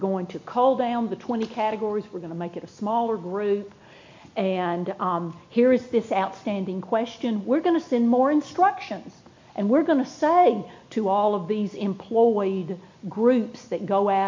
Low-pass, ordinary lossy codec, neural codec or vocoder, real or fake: 7.2 kHz; MP3, 48 kbps; none; real